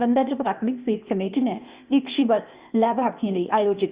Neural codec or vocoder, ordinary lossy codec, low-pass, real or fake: codec, 16 kHz, 0.8 kbps, ZipCodec; Opus, 64 kbps; 3.6 kHz; fake